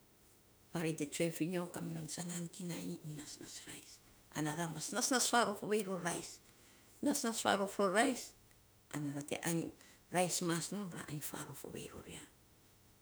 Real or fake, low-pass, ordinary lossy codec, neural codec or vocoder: fake; none; none; autoencoder, 48 kHz, 32 numbers a frame, DAC-VAE, trained on Japanese speech